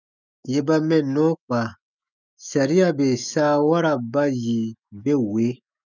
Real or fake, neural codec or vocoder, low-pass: fake; autoencoder, 48 kHz, 128 numbers a frame, DAC-VAE, trained on Japanese speech; 7.2 kHz